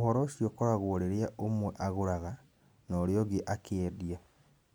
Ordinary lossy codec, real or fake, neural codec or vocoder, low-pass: none; real; none; none